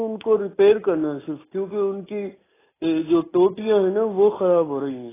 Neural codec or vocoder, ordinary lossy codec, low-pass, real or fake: none; AAC, 16 kbps; 3.6 kHz; real